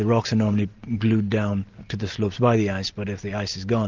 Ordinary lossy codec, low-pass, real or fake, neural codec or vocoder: Opus, 32 kbps; 7.2 kHz; real; none